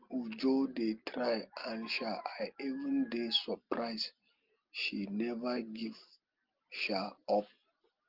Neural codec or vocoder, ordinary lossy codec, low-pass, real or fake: none; Opus, 32 kbps; 5.4 kHz; real